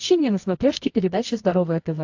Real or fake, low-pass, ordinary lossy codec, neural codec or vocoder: fake; 7.2 kHz; AAC, 48 kbps; codec, 24 kHz, 0.9 kbps, WavTokenizer, medium music audio release